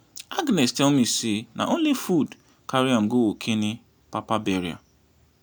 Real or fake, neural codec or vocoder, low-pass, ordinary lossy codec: real; none; none; none